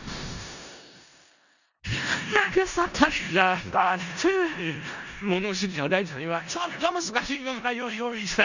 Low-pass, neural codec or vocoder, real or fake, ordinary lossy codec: 7.2 kHz; codec, 16 kHz in and 24 kHz out, 0.4 kbps, LongCat-Audio-Codec, four codebook decoder; fake; none